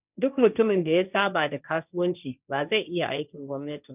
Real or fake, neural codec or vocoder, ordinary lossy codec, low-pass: fake; codec, 16 kHz, 1.1 kbps, Voila-Tokenizer; none; 3.6 kHz